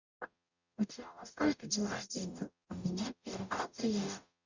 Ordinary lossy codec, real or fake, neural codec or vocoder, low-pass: Opus, 64 kbps; fake; codec, 44.1 kHz, 0.9 kbps, DAC; 7.2 kHz